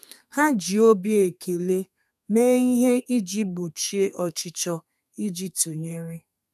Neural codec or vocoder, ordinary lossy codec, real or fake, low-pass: codec, 32 kHz, 1.9 kbps, SNAC; none; fake; 14.4 kHz